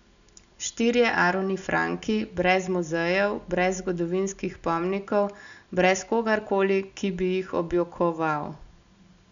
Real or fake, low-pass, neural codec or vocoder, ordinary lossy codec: real; 7.2 kHz; none; none